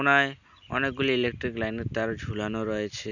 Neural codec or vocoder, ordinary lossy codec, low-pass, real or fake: none; none; 7.2 kHz; real